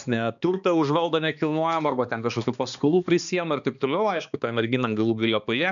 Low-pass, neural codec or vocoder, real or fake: 7.2 kHz; codec, 16 kHz, 2 kbps, X-Codec, HuBERT features, trained on balanced general audio; fake